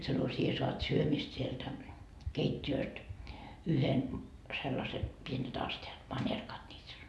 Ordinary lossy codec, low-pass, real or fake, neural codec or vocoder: none; none; real; none